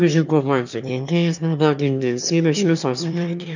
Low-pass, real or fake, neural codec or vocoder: 7.2 kHz; fake; autoencoder, 22.05 kHz, a latent of 192 numbers a frame, VITS, trained on one speaker